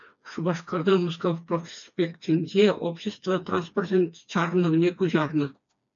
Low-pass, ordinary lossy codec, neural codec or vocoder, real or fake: 7.2 kHz; MP3, 96 kbps; codec, 16 kHz, 2 kbps, FreqCodec, smaller model; fake